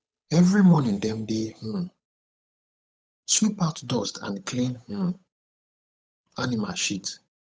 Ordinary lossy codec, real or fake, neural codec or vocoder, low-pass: none; fake; codec, 16 kHz, 8 kbps, FunCodec, trained on Chinese and English, 25 frames a second; none